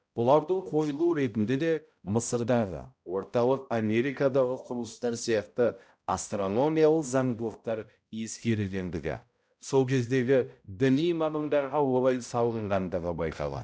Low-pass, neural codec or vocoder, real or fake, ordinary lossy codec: none; codec, 16 kHz, 0.5 kbps, X-Codec, HuBERT features, trained on balanced general audio; fake; none